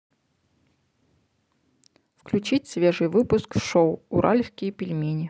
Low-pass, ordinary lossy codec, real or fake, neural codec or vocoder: none; none; real; none